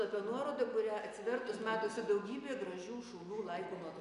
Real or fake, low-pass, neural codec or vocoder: real; 10.8 kHz; none